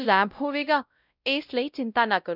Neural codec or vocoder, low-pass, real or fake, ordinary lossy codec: codec, 16 kHz, 0.5 kbps, X-Codec, WavLM features, trained on Multilingual LibriSpeech; 5.4 kHz; fake; none